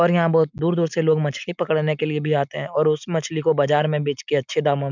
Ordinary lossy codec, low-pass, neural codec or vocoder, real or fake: none; 7.2 kHz; none; real